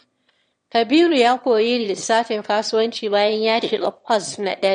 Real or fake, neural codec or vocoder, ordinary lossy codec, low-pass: fake; autoencoder, 22.05 kHz, a latent of 192 numbers a frame, VITS, trained on one speaker; MP3, 48 kbps; 9.9 kHz